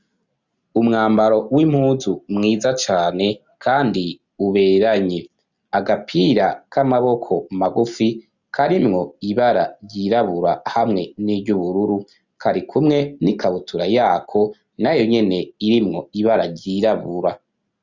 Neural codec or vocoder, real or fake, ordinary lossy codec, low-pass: none; real; Opus, 64 kbps; 7.2 kHz